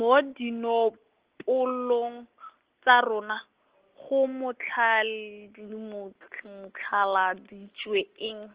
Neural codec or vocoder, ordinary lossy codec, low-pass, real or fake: none; Opus, 16 kbps; 3.6 kHz; real